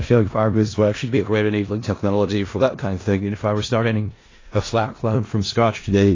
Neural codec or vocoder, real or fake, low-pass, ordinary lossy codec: codec, 16 kHz in and 24 kHz out, 0.4 kbps, LongCat-Audio-Codec, four codebook decoder; fake; 7.2 kHz; AAC, 32 kbps